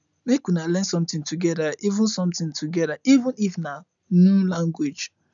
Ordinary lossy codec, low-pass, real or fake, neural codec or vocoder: none; 7.2 kHz; real; none